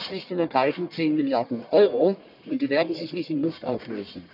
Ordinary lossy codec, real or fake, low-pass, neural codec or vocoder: none; fake; 5.4 kHz; codec, 44.1 kHz, 1.7 kbps, Pupu-Codec